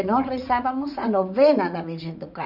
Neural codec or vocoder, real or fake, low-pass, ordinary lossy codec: vocoder, 44.1 kHz, 80 mel bands, Vocos; fake; 5.4 kHz; none